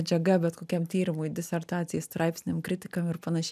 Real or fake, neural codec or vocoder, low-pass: real; none; 14.4 kHz